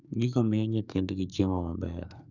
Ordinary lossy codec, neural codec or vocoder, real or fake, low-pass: none; codec, 44.1 kHz, 2.6 kbps, SNAC; fake; 7.2 kHz